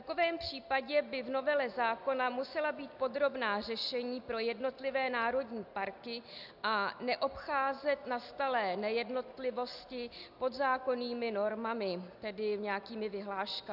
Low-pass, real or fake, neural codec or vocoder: 5.4 kHz; real; none